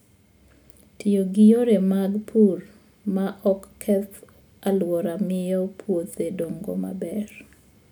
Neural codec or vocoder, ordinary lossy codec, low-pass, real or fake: none; none; none; real